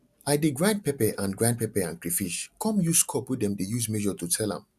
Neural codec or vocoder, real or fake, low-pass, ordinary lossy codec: none; real; 14.4 kHz; none